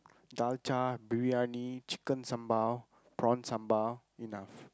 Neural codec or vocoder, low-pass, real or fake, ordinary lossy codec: none; none; real; none